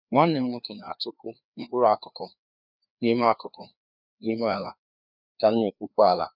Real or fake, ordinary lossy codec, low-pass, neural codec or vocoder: fake; none; 5.4 kHz; codec, 16 kHz, 2 kbps, FreqCodec, larger model